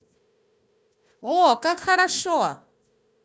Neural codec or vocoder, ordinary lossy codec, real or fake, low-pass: codec, 16 kHz, 1 kbps, FunCodec, trained on Chinese and English, 50 frames a second; none; fake; none